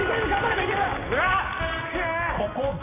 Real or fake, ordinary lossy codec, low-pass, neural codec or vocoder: real; AAC, 16 kbps; 3.6 kHz; none